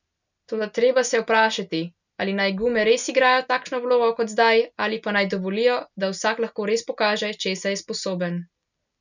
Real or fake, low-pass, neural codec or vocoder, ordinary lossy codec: real; 7.2 kHz; none; none